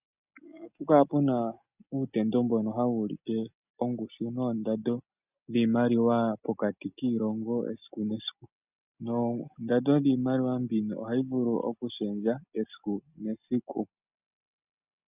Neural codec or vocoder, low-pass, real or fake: none; 3.6 kHz; real